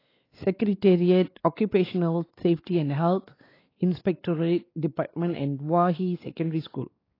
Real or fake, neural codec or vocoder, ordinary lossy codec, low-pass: fake; codec, 16 kHz, 4 kbps, X-Codec, WavLM features, trained on Multilingual LibriSpeech; AAC, 24 kbps; 5.4 kHz